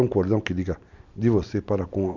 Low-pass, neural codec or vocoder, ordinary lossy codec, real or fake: 7.2 kHz; none; MP3, 64 kbps; real